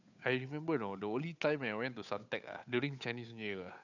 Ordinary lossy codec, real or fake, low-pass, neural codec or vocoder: none; fake; 7.2 kHz; codec, 16 kHz, 8 kbps, FunCodec, trained on Chinese and English, 25 frames a second